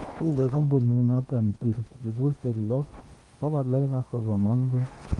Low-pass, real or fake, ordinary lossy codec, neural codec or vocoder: 10.8 kHz; fake; Opus, 24 kbps; codec, 16 kHz in and 24 kHz out, 0.8 kbps, FocalCodec, streaming, 65536 codes